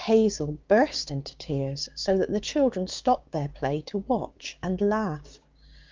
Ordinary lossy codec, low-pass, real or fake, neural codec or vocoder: Opus, 24 kbps; 7.2 kHz; fake; codec, 16 kHz, 6 kbps, DAC